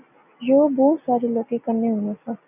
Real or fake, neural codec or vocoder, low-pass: real; none; 3.6 kHz